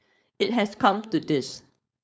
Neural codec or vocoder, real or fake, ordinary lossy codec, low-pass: codec, 16 kHz, 4.8 kbps, FACodec; fake; none; none